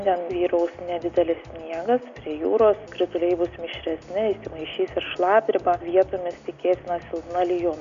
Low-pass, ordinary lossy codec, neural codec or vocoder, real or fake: 7.2 kHz; AAC, 96 kbps; none; real